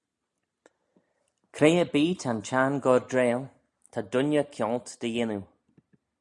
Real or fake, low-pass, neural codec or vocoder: real; 10.8 kHz; none